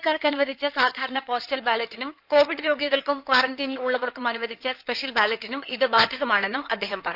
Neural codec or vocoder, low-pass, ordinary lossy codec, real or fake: codec, 16 kHz in and 24 kHz out, 2.2 kbps, FireRedTTS-2 codec; 5.4 kHz; none; fake